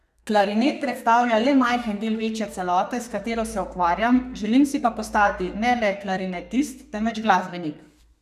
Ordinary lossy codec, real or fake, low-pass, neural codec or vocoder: none; fake; 14.4 kHz; codec, 32 kHz, 1.9 kbps, SNAC